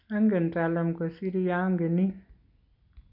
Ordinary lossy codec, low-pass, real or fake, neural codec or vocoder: none; 5.4 kHz; real; none